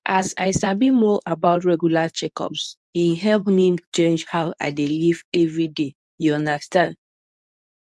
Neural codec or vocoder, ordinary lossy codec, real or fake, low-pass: codec, 24 kHz, 0.9 kbps, WavTokenizer, medium speech release version 2; none; fake; none